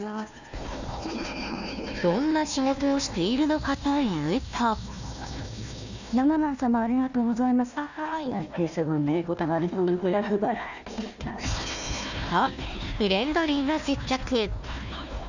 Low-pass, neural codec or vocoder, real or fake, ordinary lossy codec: 7.2 kHz; codec, 16 kHz, 1 kbps, FunCodec, trained on Chinese and English, 50 frames a second; fake; none